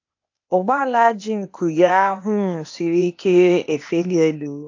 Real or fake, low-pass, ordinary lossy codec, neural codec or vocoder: fake; 7.2 kHz; AAC, 48 kbps; codec, 16 kHz, 0.8 kbps, ZipCodec